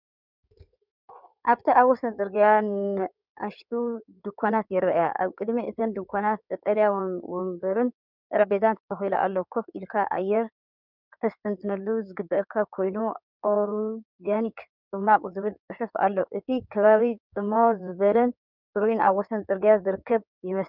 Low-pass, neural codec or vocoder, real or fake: 5.4 kHz; codec, 16 kHz in and 24 kHz out, 2.2 kbps, FireRedTTS-2 codec; fake